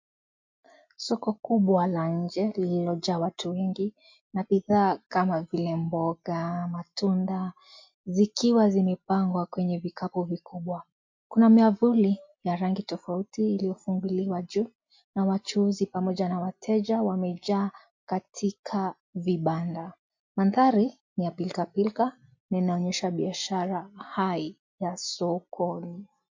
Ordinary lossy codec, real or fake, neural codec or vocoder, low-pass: MP3, 48 kbps; real; none; 7.2 kHz